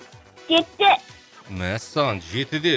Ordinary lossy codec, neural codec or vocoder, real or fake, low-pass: none; none; real; none